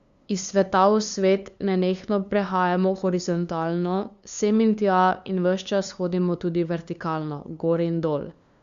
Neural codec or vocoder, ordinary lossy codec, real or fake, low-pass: codec, 16 kHz, 2 kbps, FunCodec, trained on LibriTTS, 25 frames a second; Opus, 64 kbps; fake; 7.2 kHz